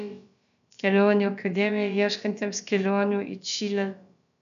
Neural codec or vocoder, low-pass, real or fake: codec, 16 kHz, about 1 kbps, DyCAST, with the encoder's durations; 7.2 kHz; fake